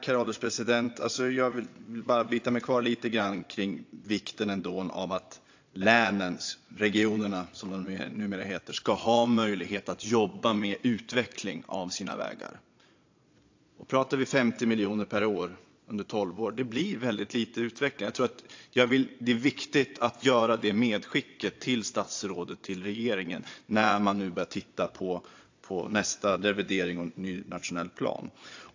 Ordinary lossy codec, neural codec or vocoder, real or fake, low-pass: AAC, 48 kbps; vocoder, 22.05 kHz, 80 mel bands, Vocos; fake; 7.2 kHz